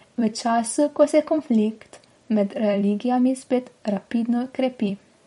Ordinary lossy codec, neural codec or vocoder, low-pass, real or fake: MP3, 48 kbps; vocoder, 44.1 kHz, 128 mel bands every 256 samples, BigVGAN v2; 19.8 kHz; fake